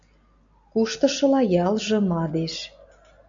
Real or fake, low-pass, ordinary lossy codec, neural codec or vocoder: real; 7.2 kHz; MP3, 64 kbps; none